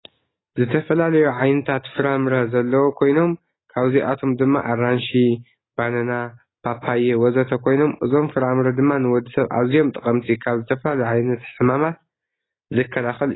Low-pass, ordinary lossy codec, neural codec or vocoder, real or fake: 7.2 kHz; AAC, 16 kbps; none; real